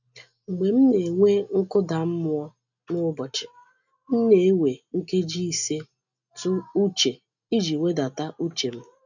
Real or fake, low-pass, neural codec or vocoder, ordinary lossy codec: real; 7.2 kHz; none; none